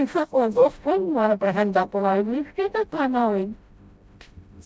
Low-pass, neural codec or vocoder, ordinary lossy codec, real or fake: none; codec, 16 kHz, 0.5 kbps, FreqCodec, smaller model; none; fake